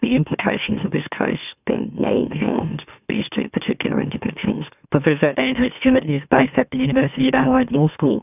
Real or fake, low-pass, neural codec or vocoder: fake; 3.6 kHz; autoencoder, 44.1 kHz, a latent of 192 numbers a frame, MeloTTS